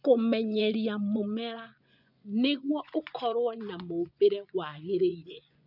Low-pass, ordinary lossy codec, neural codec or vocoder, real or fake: 5.4 kHz; none; vocoder, 24 kHz, 100 mel bands, Vocos; fake